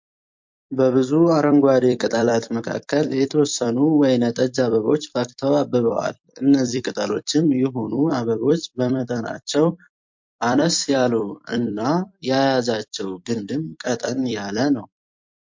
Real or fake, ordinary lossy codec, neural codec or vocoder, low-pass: fake; MP3, 48 kbps; vocoder, 44.1 kHz, 128 mel bands every 256 samples, BigVGAN v2; 7.2 kHz